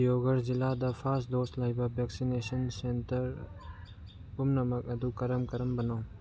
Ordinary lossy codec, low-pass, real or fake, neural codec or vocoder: none; none; real; none